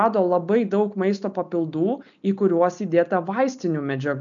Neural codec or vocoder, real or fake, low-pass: none; real; 7.2 kHz